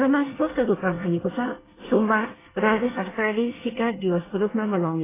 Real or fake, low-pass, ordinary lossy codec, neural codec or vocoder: fake; 3.6 kHz; AAC, 16 kbps; codec, 24 kHz, 1 kbps, SNAC